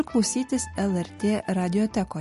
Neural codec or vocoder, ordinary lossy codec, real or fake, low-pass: none; MP3, 48 kbps; real; 14.4 kHz